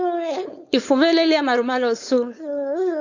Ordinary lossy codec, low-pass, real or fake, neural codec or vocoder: AAC, 48 kbps; 7.2 kHz; fake; codec, 16 kHz, 4.8 kbps, FACodec